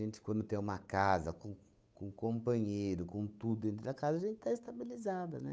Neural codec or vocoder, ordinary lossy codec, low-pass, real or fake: codec, 16 kHz, 8 kbps, FunCodec, trained on Chinese and English, 25 frames a second; none; none; fake